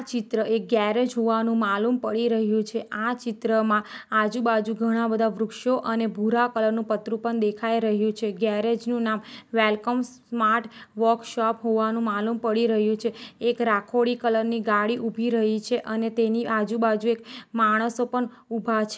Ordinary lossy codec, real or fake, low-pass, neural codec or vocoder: none; real; none; none